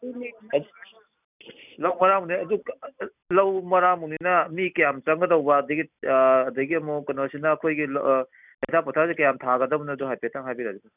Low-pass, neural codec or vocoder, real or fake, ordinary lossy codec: 3.6 kHz; none; real; none